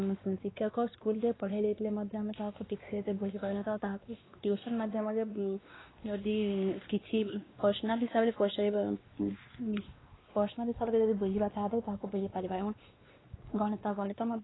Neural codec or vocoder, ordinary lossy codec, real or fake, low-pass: codec, 16 kHz, 2 kbps, X-Codec, WavLM features, trained on Multilingual LibriSpeech; AAC, 16 kbps; fake; 7.2 kHz